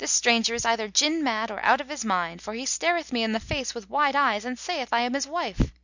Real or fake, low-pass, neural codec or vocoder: real; 7.2 kHz; none